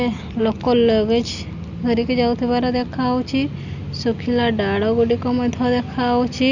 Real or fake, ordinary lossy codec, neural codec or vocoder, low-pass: real; none; none; 7.2 kHz